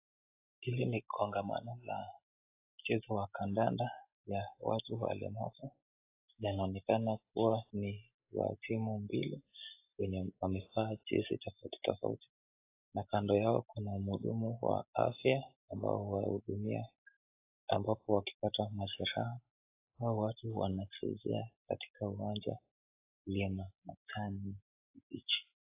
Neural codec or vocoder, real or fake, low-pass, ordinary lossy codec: none; real; 3.6 kHz; AAC, 24 kbps